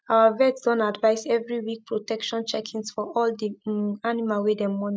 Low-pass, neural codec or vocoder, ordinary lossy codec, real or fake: none; none; none; real